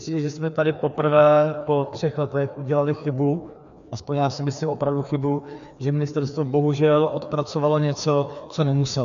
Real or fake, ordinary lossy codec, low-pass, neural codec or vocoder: fake; AAC, 96 kbps; 7.2 kHz; codec, 16 kHz, 2 kbps, FreqCodec, larger model